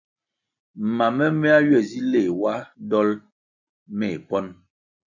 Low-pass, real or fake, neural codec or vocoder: 7.2 kHz; real; none